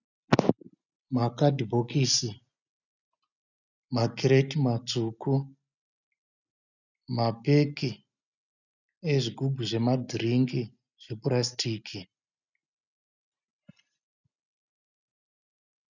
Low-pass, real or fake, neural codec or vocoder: 7.2 kHz; real; none